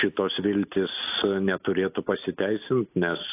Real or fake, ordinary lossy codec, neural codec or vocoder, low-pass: real; AAC, 24 kbps; none; 3.6 kHz